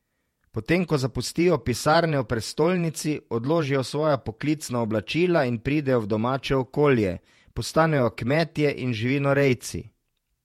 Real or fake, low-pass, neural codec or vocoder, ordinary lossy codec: fake; 19.8 kHz; vocoder, 48 kHz, 128 mel bands, Vocos; MP3, 64 kbps